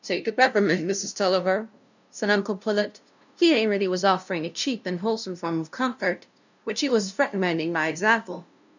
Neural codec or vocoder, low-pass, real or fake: codec, 16 kHz, 0.5 kbps, FunCodec, trained on LibriTTS, 25 frames a second; 7.2 kHz; fake